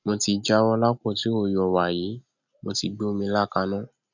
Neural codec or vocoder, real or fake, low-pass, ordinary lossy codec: none; real; 7.2 kHz; none